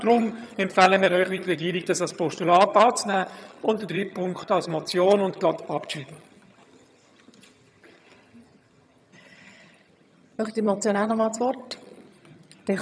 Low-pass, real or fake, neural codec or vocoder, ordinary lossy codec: none; fake; vocoder, 22.05 kHz, 80 mel bands, HiFi-GAN; none